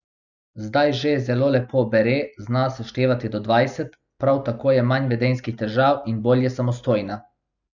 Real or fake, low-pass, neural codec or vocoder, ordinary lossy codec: real; 7.2 kHz; none; none